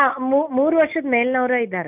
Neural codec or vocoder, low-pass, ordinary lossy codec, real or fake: none; 3.6 kHz; none; real